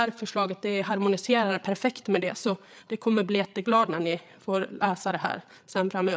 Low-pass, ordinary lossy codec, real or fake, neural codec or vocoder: none; none; fake; codec, 16 kHz, 8 kbps, FreqCodec, larger model